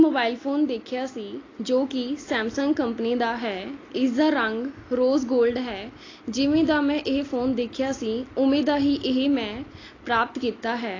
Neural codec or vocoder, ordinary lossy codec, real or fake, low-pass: none; AAC, 32 kbps; real; 7.2 kHz